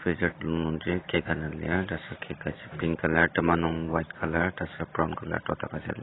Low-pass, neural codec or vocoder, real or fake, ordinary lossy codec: 7.2 kHz; none; real; AAC, 16 kbps